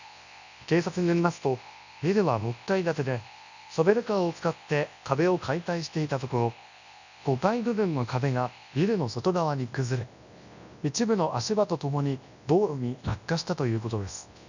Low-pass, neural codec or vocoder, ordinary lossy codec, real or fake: 7.2 kHz; codec, 24 kHz, 0.9 kbps, WavTokenizer, large speech release; none; fake